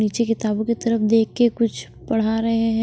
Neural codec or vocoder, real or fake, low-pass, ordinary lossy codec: none; real; none; none